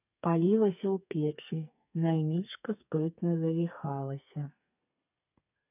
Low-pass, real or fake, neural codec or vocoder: 3.6 kHz; fake; codec, 32 kHz, 1.9 kbps, SNAC